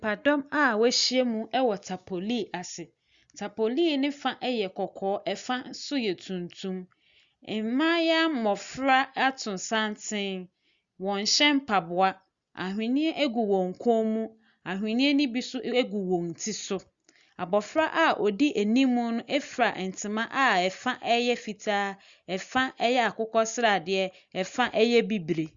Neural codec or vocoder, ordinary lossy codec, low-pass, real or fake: none; Opus, 64 kbps; 7.2 kHz; real